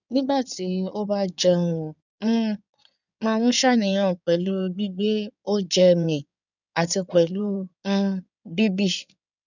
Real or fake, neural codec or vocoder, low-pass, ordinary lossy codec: fake; codec, 16 kHz in and 24 kHz out, 2.2 kbps, FireRedTTS-2 codec; 7.2 kHz; none